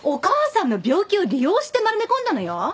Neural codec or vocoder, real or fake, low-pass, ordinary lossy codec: none; real; none; none